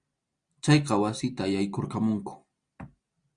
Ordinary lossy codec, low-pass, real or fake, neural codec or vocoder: Opus, 64 kbps; 9.9 kHz; real; none